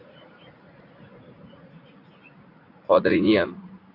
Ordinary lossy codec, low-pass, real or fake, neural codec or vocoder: AAC, 32 kbps; 5.4 kHz; fake; vocoder, 44.1 kHz, 80 mel bands, Vocos